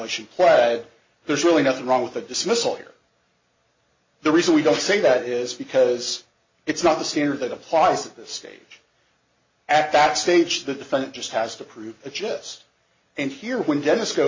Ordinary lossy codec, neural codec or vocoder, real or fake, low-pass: MP3, 32 kbps; none; real; 7.2 kHz